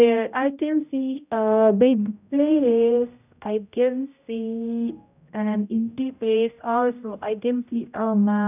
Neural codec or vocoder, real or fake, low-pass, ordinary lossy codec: codec, 16 kHz, 0.5 kbps, X-Codec, HuBERT features, trained on general audio; fake; 3.6 kHz; none